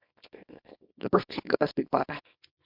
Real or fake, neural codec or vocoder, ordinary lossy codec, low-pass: fake; codec, 24 kHz, 0.9 kbps, WavTokenizer, small release; MP3, 48 kbps; 5.4 kHz